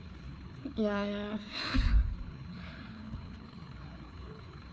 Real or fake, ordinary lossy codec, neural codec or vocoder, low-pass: fake; none; codec, 16 kHz, 4 kbps, FreqCodec, larger model; none